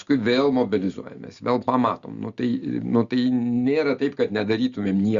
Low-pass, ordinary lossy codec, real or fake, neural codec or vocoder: 7.2 kHz; Opus, 64 kbps; real; none